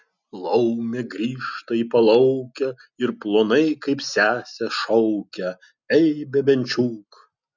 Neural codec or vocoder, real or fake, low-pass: none; real; 7.2 kHz